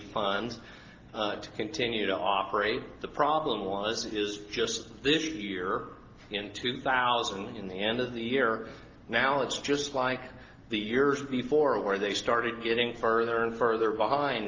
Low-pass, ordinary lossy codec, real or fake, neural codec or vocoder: 7.2 kHz; Opus, 32 kbps; real; none